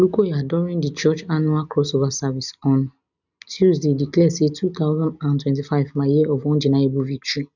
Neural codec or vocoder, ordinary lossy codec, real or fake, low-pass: none; none; real; 7.2 kHz